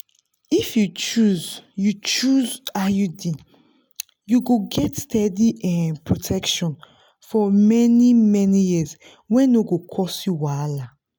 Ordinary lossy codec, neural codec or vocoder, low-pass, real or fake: none; none; none; real